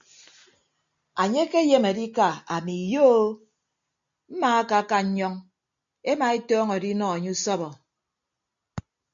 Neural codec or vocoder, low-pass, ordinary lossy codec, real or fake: none; 7.2 kHz; MP3, 64 kbps; real